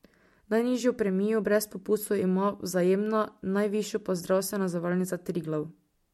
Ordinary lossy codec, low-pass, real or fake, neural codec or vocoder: MP3, 64 kbps; 19.8 kHz; real; none